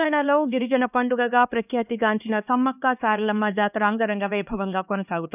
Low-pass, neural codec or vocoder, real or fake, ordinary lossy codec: 3.6 kHz; codec, 16 kHz, 2 kbps, X-Codec, HuBERT features, trained on LibriSpeech; fake; none